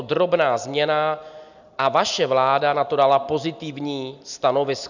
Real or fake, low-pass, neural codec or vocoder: real; 7.2 kHz; none